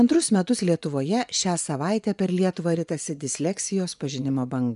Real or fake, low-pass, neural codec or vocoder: fake; 10.8 kHz; vocoder, 24 kHz, 100 mel bands, Vocos